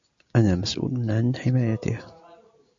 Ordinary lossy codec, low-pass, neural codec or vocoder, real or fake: AAC, 64 kbps; 7.2 kHz; none; real